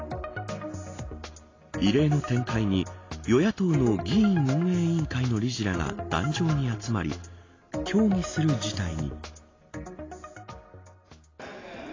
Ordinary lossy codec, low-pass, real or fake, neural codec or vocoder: AAC, 32 kbps; 7.2 kHz; real; none